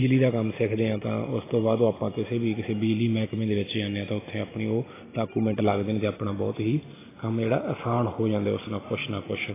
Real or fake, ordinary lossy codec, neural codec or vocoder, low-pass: real; AAC, 16 kbps; none; 3.6 kHz